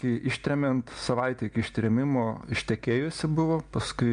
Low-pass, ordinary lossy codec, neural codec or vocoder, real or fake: 9.9 kHz; MP3, 64 kbps; none; real